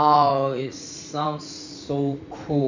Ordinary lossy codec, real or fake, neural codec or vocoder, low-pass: none; fake; vocoder, 44.1 kHz, 80 mel bands, Vocos; 7.2 kHz